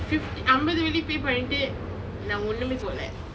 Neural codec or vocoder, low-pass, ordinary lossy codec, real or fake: none; none; none; real